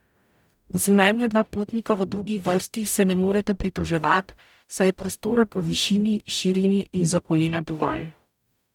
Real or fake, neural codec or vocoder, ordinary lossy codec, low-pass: fake; codec, 44.1 kHz, 0.9 kbps, DAC; none; 19.8 kHz